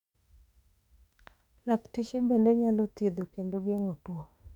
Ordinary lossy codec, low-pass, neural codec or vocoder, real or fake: none; 19.8 kHz; autoencoder, 48 kHz, 32 numbers a frame, DAC-VAE, trained on Japanese speech; fake